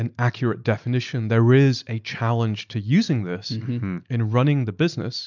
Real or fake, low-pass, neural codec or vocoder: real; 7.2 kHz; none